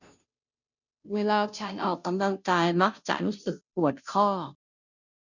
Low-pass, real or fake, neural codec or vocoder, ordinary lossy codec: 7.2 kHz; fake; codec, 16 kHz, 0.5 kbps, FunCodec, trained on Chinese and English, 25 frames a second; none